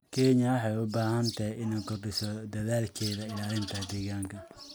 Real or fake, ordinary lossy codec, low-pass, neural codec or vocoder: real; none; none; none